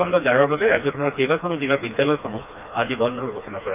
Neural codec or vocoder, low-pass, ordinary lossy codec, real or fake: codec, 16 kHz, 2 kbps, FreqCodec, smaller model; 3.6 kHz; none; fake